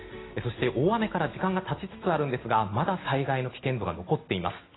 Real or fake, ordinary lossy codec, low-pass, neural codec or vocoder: real; AAC, 16 kbps; 7.2 kHz; none